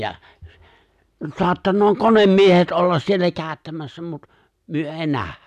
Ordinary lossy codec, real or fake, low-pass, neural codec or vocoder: none; real; 14.4 kHz; none